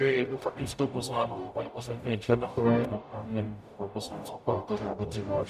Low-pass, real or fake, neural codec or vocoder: 14.4 kHz; fake; codec, 44.1 kHz, 0.9 kbps, DAC